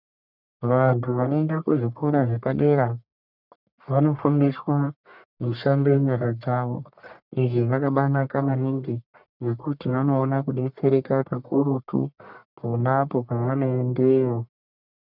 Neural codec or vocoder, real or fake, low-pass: codec, 44.1 kHz, 1.7 kbps, Pupu-Codec; fake; 5.4 kHz